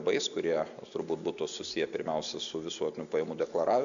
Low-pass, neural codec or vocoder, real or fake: 7.2 kHz; none; real